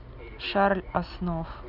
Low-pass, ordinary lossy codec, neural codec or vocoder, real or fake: 5.4 kHz; none; none; real